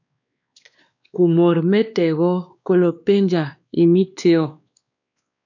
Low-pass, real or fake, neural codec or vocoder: 7.2 kHz; fake; codec, 16 kHz, 2 kbps, X-Codec, WavLM features, trained on Multilingual LibriSpeech